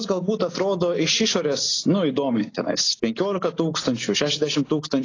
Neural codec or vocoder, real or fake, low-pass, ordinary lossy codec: none; real; 7.2 kHz; AAC, 32 kbps